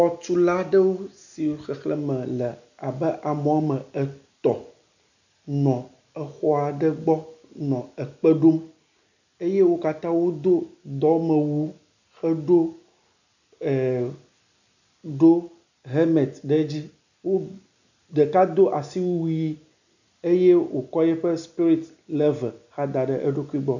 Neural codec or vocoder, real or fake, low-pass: none; real; 7.2 kHz